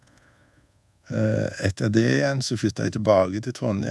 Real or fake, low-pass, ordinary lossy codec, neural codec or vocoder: fake; none; none; codec, 24 kHz, 1.2 kbps, DualCodec